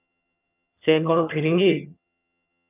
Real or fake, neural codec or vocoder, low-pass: fake; vocoder, 22.05 kHz, 80 mel bands, HiFi-GAN; 3.6 kHz